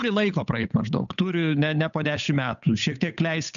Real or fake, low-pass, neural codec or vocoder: fake; 7.2 kHz; codec, 16 kHz, 8 kbps, FunCodec, trained on Chinese and English, 25 frames a second